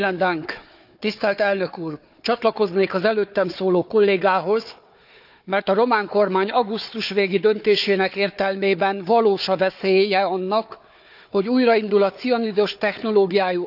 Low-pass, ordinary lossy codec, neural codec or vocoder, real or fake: 5.4 kHz; none; codec, 16 kHz, 4 kbps, FunCodec, trained on Chinese and English, 50 frames a second; fake